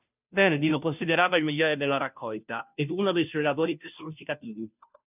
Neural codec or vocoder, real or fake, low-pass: codec, 16 kHz, 0.5 kbps, FunCodec, trained on Chinese and English, 25 frames a second; fake; 3.6 kHz